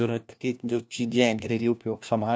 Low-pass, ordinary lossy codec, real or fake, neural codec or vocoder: none; none; fake; codec, 16 kHz, 1 kbps, FunCodec, trained on LibriTTS, 50 frames a second